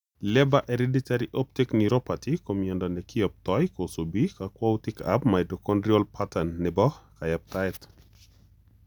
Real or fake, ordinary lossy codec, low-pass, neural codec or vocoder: real; none; 19.8 kHz; none